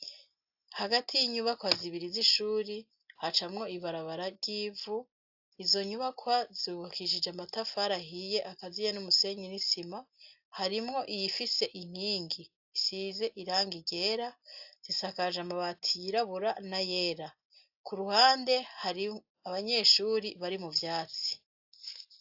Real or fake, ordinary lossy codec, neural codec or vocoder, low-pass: real; AAC, 48 kbps; none; 5.4 kHz